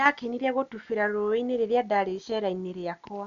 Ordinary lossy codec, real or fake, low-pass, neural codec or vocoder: none; real; 7.2 kHz; none